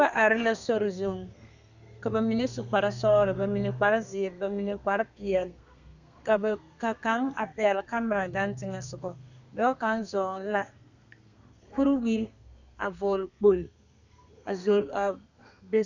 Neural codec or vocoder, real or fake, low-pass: codec, 32 kHz, 1.9 kbps, SNAC; fake; 7.2 kHz